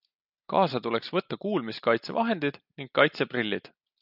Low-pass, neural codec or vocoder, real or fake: 5.4 kHz; none; real